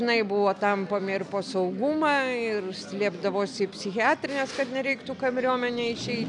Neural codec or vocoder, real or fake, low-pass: none; real; 10.8 kHz